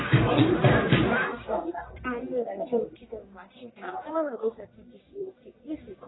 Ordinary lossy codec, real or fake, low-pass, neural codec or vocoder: AAC, 16 kbps; fake; 7.2 kHz; codec, 44.1 kHz, 1.7 kbps, Pupu-Codec